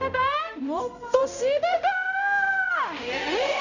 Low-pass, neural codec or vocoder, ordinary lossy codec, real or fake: 7.2 kHz; codec, 16 kHz, 0.5 kbps, X-Codec, HuBERT features, trained on balanced general audio; none; fake